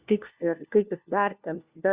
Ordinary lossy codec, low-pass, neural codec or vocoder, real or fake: Opus, 64 kbps; 3.6 kHz; codec, 16 kHz, 1 kbps, FunCodec, trained on LibriTTS, 50 frames a second; fake